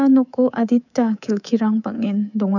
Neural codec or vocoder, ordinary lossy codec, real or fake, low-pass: codec, 24 kHz, 3.1 kbps, DualCodec; none; fake; 7.2 kHz